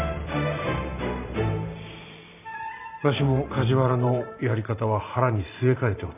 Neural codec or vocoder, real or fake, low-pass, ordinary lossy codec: vocoder, 44.1 kHz, 128 mel bands every 512 samples, BigVGAN v2; fake; 3.6 kHz; none